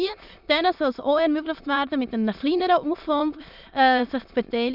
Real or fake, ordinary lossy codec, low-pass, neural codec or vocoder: fake; none; 5.4 kHz; autoencoder, 22.05 kHz, a latent of 192 numbers a frame, VITS, trained on many speakers